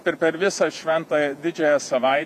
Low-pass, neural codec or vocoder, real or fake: 14.4 kHz; vocoder, 48 kHz, 128 mel bands, Vocos; fake